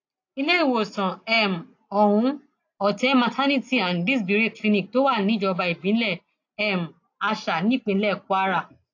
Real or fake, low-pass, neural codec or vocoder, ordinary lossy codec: real; 7.2 kHz; none; AAC, 48 kbps